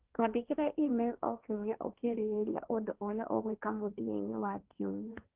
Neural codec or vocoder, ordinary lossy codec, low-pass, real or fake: codec, 16 kHz, 1.1 kbps, Voila-Tokenizer; Opus, 32 kbps; 3.6 kHz; fake